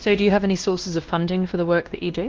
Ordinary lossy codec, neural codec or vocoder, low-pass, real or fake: Opus, 16 kbps; codec, 16 kHz, 1 kbps, X-Codec, WavLM features, trained on Multilingual LibriSpeech; 7.2 kHz; fake